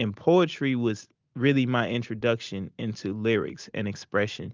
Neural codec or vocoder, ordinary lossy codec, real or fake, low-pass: none; Opus, 24 kbps; real; 7.2 kHz